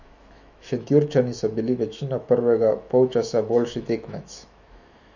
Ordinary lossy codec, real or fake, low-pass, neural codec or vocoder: none; fake; 7.2 kHz; autoencoder, 48 kHz, 128 numbers a frame, DAC-VAE, trained on Japanese speech